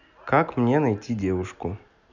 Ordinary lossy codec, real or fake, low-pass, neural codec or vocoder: none; real; 7.2 kHz; none